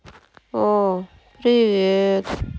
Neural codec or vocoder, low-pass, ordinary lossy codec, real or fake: none; none; none; real